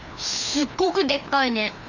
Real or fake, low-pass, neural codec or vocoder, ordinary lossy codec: fake; 7.2 kHz; codec, 16 kHz, 2 kbps, FreqCodec, larger model; none